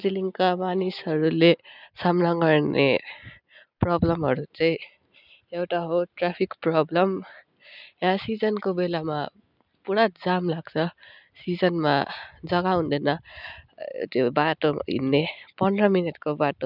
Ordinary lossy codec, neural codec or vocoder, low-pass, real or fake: none; none; 5.4 kHz; real